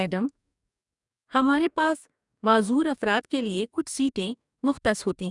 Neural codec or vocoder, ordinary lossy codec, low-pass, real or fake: codec, 44.1 kHz, 2.6 kbps, DAC; none; 10.8 kHz; fake